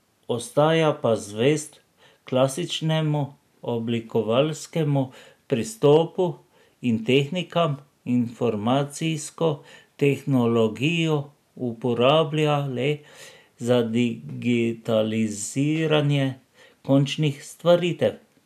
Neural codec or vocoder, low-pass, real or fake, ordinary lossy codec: none; 14.4 kHz; real; none